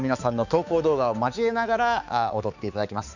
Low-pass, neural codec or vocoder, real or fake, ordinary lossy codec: 7.2 kHz; codec, 16 kHz, 4 kbps, X-Codec, HuBERT features, trained on balanced general audio; fake; none